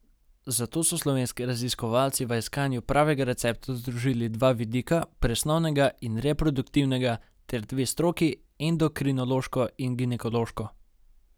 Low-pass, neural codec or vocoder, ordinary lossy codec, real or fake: none; none; none; real